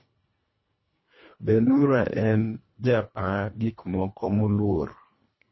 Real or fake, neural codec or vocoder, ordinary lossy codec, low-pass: fake; codec, 24 kHz, 1.5 kbps, HILCodec; MP3, 24 kbps; 7.2 kHz